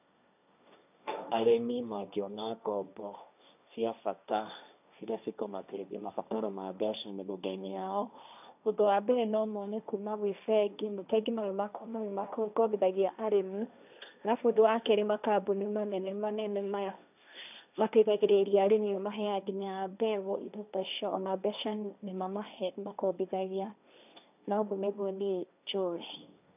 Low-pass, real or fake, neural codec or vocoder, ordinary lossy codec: 3.6 kHz; fake; codec, 16 kHz, 1.1 kbps, Voila-Tokenizer; none